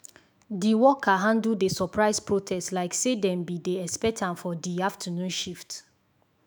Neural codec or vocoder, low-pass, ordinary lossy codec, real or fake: autoencoder, 48 kHz, 128 numbers a frame, DAC-VAE, trained on Japanese speech; none; none; fake